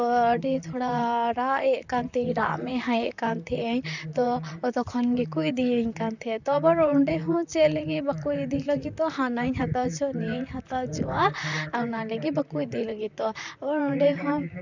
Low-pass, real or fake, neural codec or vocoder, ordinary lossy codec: 7.2 kHz; fake; vocoder, 44.1 kHz, 128 mel bands, Pupu-Vocoder; none